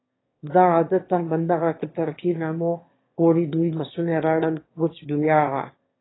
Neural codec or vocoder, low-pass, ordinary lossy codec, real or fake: autoencoder, 22.05 kHz, a latent of 192 numbers a frame, VITS, trained on one speaker; 7.2 kHz; AAC, 16 kbps; fake